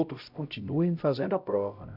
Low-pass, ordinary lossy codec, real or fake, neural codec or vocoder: 5.4 kHz; none; fake; codec, 16 kHz, 0.5 kbps, X-Codec, HuBERT features, trained on LibriSpeech